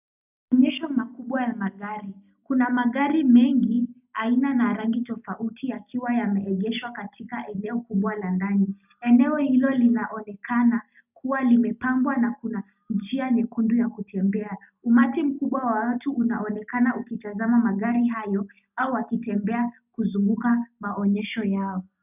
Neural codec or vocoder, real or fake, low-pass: none; real; 3.6 kHz